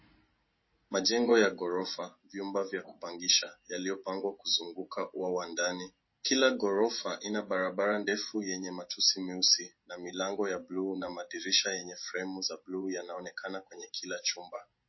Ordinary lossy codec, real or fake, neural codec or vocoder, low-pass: MP3, 24 kbps; real; none; 7.2 kHz